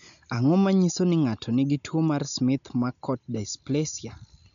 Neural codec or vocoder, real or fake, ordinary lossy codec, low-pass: none; real; none; 7.2 kHz